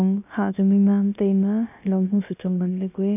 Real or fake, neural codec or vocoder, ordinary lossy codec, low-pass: fake; codec, 16 kHz, about 1 kbps, DyCAST, with the encoder's durations; none; 3.6 kHz